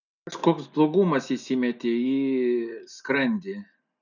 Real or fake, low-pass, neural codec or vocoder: real; 7.2 kHz; none